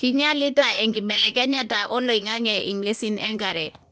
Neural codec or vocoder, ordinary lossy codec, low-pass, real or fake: codec, 16 kHz, 0.8 kbps, ZipCodec; none; none; fake